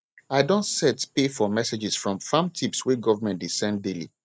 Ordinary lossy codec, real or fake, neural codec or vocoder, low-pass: none; real; none; none